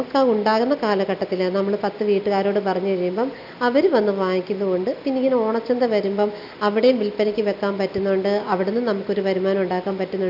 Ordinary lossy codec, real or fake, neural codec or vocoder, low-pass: none; real; none; 5.4 kHz